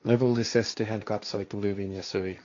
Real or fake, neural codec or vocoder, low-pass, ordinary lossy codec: fake; codec, 16 kHz, 1.1 kbps, Voila-Tokenizer; 7.2 kHz; AAC, 48 kbps